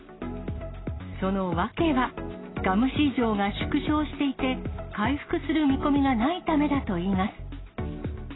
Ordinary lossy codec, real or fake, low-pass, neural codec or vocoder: AAC, 16 kbps; real; 7.2 kHz; none